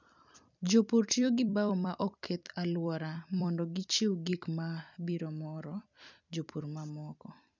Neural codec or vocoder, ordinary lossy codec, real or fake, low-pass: vocoder, 44.1 kHz, 128 mel bands every 256 samples, BigVGAN v2; none; fake; 7.2 kHz